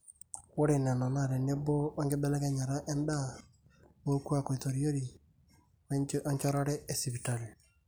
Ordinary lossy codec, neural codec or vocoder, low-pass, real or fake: none; none; none; real